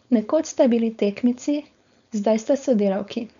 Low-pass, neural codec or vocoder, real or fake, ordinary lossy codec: 7.2 kHz; codec, 16 kHz, 4.8 kbps, FACodec; fake; none